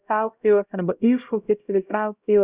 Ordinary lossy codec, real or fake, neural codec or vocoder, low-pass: Opus, 64 kbps; fake; codec, 16 kHz, 0.5 kbps, X-Codec, HuBERT features, trained on LibriSpeech; 3.6 kHz